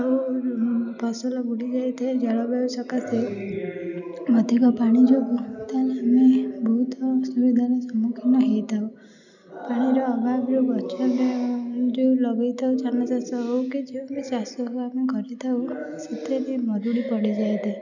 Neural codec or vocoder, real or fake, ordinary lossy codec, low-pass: none; real; none; 7.2 kHz